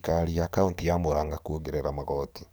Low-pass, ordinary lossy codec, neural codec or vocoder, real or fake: none; none; codec, 44.1 kHz, 7.8 kbps, DAC; fake